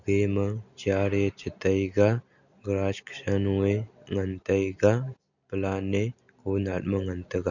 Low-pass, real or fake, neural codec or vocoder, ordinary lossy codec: 7.2 kHz; real; none; none